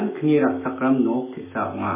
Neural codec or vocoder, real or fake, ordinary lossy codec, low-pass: autoencoder, 48 kHz, 128 numbers a frame, DAC-VAE, trained on Japanese speech; fake; MP3, 16 kbps; 3.6 kHz